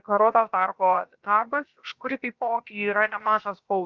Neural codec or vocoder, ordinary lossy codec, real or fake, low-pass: codec, 16 kHz, about 1 kbps, DyCAST, with the encoder's durations; Opus, 24 kbps; fake; 7.2 kHz